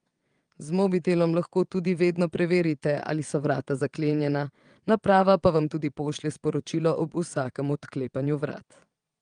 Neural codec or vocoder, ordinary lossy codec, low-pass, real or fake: vocoder, 22.05 kHz, 80 mel bands, WaveNeXt; Opus, 32 kbps; 9.9 kHz; fake